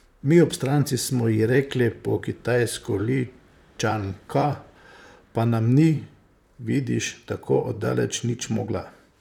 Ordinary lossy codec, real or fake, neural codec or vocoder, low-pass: none; fake; vocoder, 44.1 kHz, 128 mel bands, Pupu-Vocoder; 19.8 kHz